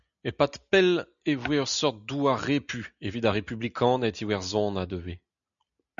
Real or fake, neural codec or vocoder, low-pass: real; none; 7.2 kHz